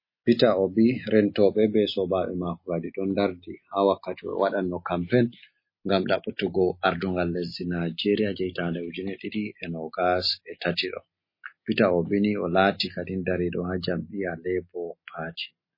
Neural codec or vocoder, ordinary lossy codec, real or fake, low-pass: none; MP3, 24 kbps; real; 5.4 kHz